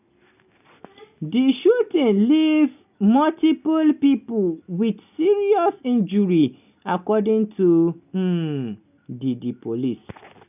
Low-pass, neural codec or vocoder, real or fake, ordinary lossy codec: 3.6 kHz; none; real; none